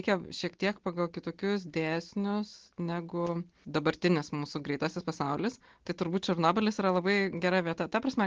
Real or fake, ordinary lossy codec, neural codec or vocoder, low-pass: real; Opus, 16 kbps; none; 7.2 kHz